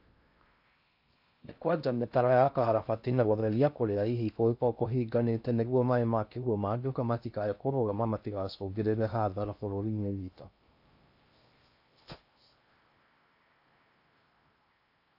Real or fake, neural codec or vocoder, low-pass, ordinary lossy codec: fake; codec, 16 kHz in and 24 kHz out, 0.6 kbps, FocalCodec, streaming, 4096 codes; 5.4 kHz; AAC, 48 kbps